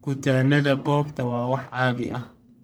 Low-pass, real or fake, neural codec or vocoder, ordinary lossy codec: none; fake; codec, 44.1 kHz, 1.7 kbps, Pupu-Codec; none